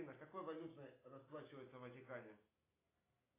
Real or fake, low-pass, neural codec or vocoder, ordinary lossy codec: real; 3.6 kHz; none; AAC, 16 kbps